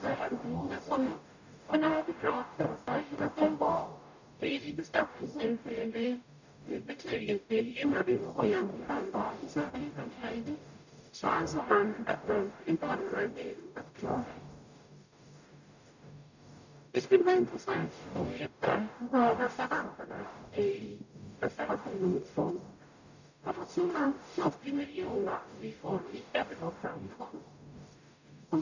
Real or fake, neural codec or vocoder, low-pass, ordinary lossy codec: fake; codec, 44.1 kHz, 0.9 kbps, DAC; 7.2 kHz; none